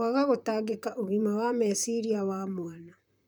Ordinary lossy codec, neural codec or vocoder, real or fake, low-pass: none; vocoder, 44.1 kHz, 128 mel bands, Pupu-Vocoder; fake; none